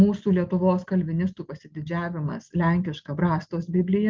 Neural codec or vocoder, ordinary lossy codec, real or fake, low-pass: none; Opus, 24 kbps; real; 7.2 kHz